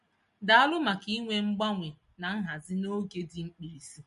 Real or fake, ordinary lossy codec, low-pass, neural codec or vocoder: real; MP3, 48 kbps; 10.8 kHz; none